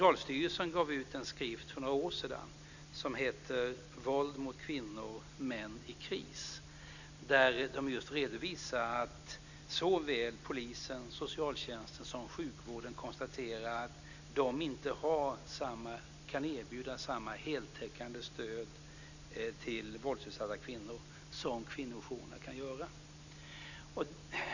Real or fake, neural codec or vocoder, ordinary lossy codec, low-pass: real; none; none; 7.2 kHz